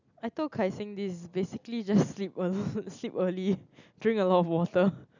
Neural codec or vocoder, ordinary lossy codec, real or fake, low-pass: none; none; real; 7.2 kHz